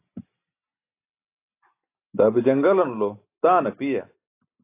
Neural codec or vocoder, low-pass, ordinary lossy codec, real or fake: none; 3.6 kHz; AAC, 24 kbps; real